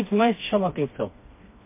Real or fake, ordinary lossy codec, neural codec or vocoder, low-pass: fake; MP3, 24 kbps; codec, 16 kHz, 2 kbps, FreqCodec, smaller model; 3.6 kHz